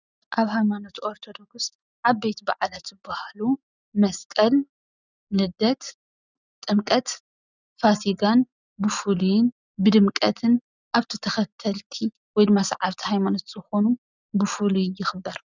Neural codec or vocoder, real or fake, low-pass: none; real; 7.2 kHz